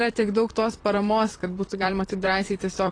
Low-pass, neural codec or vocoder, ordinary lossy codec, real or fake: 9.9 kHz; vocoder, 44.1 kHz, 128 mel bands, Pupu-Vocoder; AAC, 32 kbps; fake